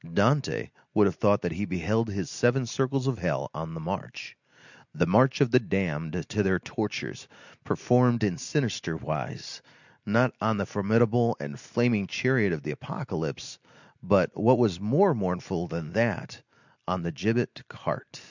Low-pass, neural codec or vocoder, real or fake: 7.2 kHz; none; real